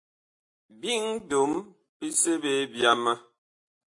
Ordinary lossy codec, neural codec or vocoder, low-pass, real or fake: AAC, 48 kbps; none; 10.8 kHz; real